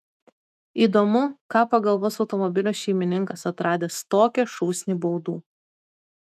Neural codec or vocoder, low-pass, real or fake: autoencoder, 48 kHz, 128 numbers a frame, DAC-VAE, trained on Japanese speech; 14.4 kHz; fake